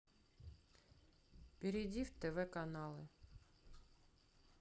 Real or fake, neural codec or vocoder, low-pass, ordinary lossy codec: real; none; none; none